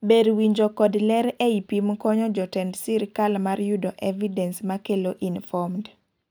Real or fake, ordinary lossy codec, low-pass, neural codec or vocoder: real; none; none; none